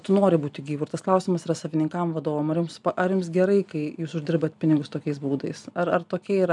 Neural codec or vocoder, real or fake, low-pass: none; real; 10.8 kHz